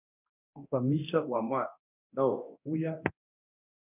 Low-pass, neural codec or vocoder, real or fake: 3.6 kHz; codec, 24 kHz, 0.9 kbps, DualCodec; fake